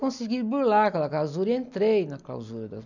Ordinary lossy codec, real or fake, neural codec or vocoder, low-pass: none; real; none; 7.2 kHz